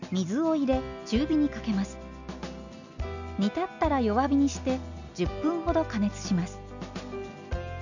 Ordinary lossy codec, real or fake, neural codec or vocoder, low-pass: none; real; none; 7.2 kHz